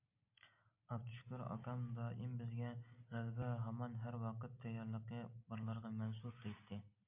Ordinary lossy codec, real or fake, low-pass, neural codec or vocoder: MP3, 32 kbps; real; 3.6 kHz; none